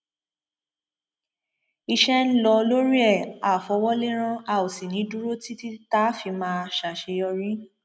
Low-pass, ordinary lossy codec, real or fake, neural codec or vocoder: none; none; real; none